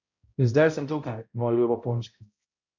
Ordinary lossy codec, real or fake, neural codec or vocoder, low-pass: MP3, 48 kbps; fake; codec, 16 kHz, 0.5 kbps, X-Codec, HuBERT features, trained on balanced general audio; 7.2 kHz